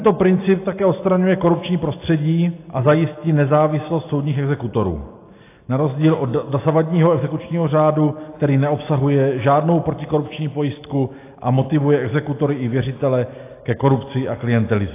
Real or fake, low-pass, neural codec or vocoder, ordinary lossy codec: real; 3.6 kHz; none; AAC, 24 kbps